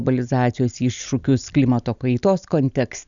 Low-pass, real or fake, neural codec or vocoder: 7.2 kHz; real; none